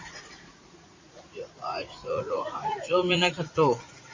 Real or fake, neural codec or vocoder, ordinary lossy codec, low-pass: fake; vocoder, 44.1 kHz, 80 mel bands, Vocos; MP3, 32 kbps; 7.2 kHz